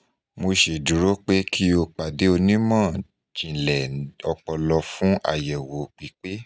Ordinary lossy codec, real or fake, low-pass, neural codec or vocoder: none; real; none; none